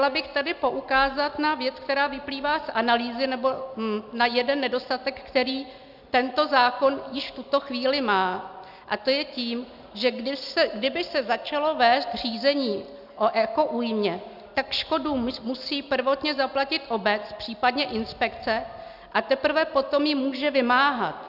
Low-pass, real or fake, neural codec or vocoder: 5.4 kHz; real; none